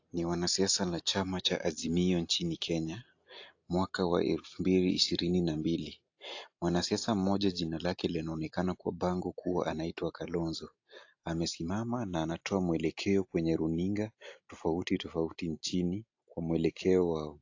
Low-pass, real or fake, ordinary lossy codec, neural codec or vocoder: 7.2 kHz; real; AAC, 48 kbps; none